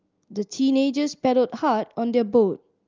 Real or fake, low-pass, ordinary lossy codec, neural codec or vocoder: real; 7.2 kHz; Opus, 32 kbps; none